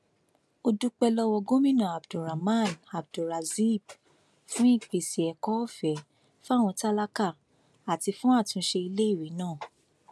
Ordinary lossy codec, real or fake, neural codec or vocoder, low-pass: none; real; none; none